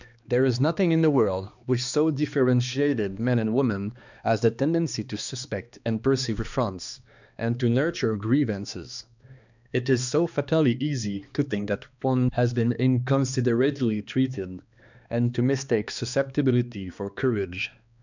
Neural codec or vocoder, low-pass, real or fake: codec, 16 kHz, 2 kbps, X-Codec, HuBERT features, trained on balanced general audio; 7.2 kHz; fake